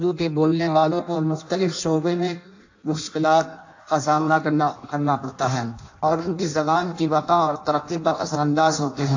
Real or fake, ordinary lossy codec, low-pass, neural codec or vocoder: fake; AAC, 48 kbps; 7.2 kHz; codec, 16 kHz in and 24 kHz out, 0.6 kbps, FireRedTTS-2 codec